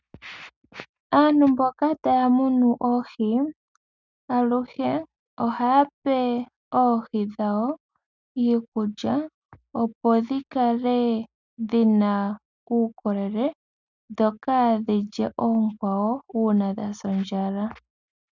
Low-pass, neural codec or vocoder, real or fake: 7.2 kHz; none; real